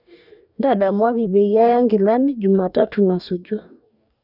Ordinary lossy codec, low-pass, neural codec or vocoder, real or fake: none; 5.4 kHz; codec, 44.1 kHz, 2.6 kbps, DAC; fake